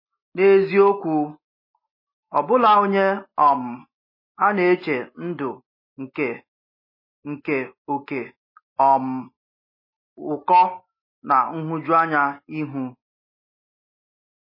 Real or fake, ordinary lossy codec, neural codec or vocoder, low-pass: real; MP3, 24 kbps; none; 5.4 kHz